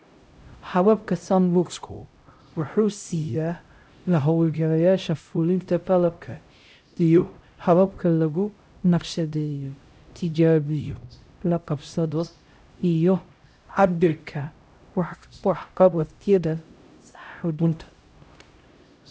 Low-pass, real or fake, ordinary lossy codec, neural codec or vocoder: none; fake; none; codec, 16 kHz, 0.5 kbps, X-Codec, HuBERT features, trained on LibriSpeech